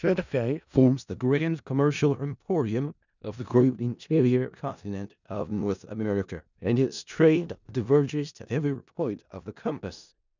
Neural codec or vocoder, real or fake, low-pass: codec, 16 kHz in and 24 kHz out, 0.4 kbps, LongCat-Audio-Codec, four codebook decoder; fake; 7.2 kHz